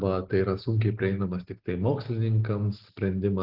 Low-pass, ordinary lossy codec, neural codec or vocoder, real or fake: 5.4 kHz; Opus, 16 kbps; none; real